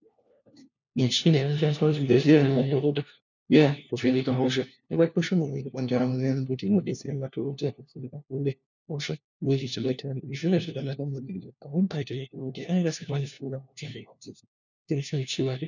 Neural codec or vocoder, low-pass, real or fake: codec, 16 kHz, 1 kbps, FunCodec, trained on LibriTTS, 50 frames a second; 7.2 kHz; fake